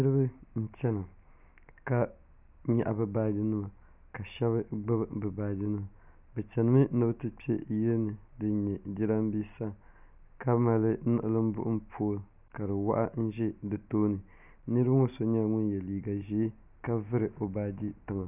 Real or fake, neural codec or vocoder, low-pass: real; none; 3.6 kHz